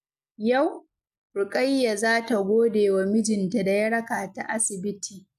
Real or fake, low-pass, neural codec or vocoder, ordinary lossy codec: real; 14.4 kHz; none; none